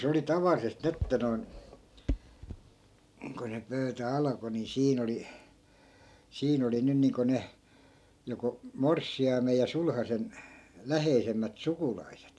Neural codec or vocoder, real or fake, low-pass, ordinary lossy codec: none; real; none; none